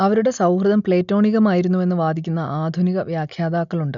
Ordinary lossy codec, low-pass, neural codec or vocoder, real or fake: none; 7.2 kHz; none; real